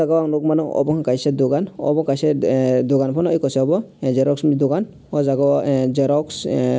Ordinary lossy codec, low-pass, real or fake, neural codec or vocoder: none; none; real; none